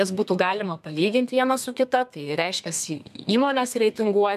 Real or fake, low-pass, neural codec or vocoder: fake; 14.4 kHz; codec, 32 kHz, 1.9 kbps, SNAC